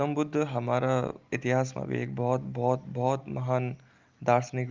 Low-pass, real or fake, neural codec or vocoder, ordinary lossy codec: 7.2 kHz; real; none; Opus, 24 kbps